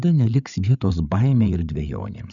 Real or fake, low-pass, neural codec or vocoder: fake; 7.2 kHz; codec, 16 kHz, 8 kbps, FreqCodec, larger model